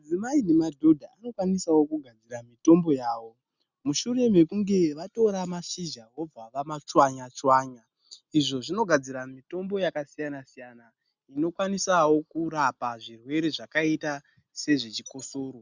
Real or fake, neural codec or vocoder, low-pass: real; none; 7.2 kHz